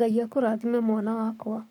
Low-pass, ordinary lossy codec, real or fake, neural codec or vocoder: 19.8 kHz; none; fake; codec, 44.1 kHz, 7.8 kbps, Pupu-Codec